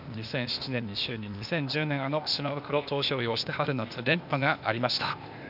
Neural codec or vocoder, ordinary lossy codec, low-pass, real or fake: codec, 16 kHz, 0.8 kbps, ZipCodec; none; 5.4 kHz; fake